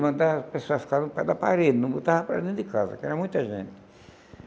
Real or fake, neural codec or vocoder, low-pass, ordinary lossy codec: real; none; none; none